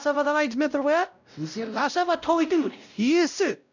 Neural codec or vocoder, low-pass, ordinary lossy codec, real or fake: codec, 16 kHz, 0.5 kbps, X-Codec, WavLM features, trained on Multilingual LibriSpeech; 7.2 kHz; none; fake